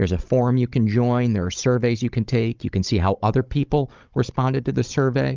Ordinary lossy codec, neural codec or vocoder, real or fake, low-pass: Opus, 32 kbps; codec, 16 kHz, 16 kbps, FunCodec, trained on Chinese and English, 50 frames a second; fake; 7.2 kHz